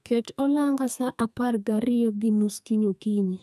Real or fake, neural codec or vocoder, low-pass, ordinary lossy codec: fake; codec, 32 kHz, 1.9 kbps, SNAC; 14.4 kHz; none